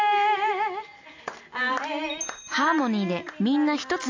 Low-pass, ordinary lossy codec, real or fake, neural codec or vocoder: 7.2 kHz; AAC, 48 kbps; real; none